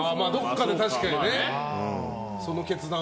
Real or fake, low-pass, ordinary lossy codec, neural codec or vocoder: real; none; none; none